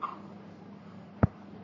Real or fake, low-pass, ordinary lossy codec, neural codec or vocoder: fake; 7.2 kHz; MP3, 32 kbps; codec, 44.1 kHz, 3.4 kbps, Pupu-Codec